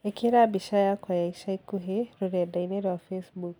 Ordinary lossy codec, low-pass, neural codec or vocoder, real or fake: none; none; none; real